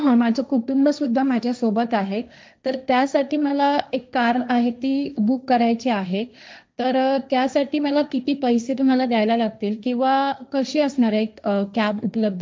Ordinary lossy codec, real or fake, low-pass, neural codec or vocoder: none; fake; none; codec, 16 kHz, 1.1 kbps, Voila-Tokenizer